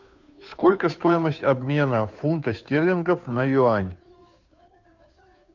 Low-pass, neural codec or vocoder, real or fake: 7.2 kHz; codec, 16 kHz, 2 kbps, FunCodec, trained on Chinese and English, 25 frames a second; fake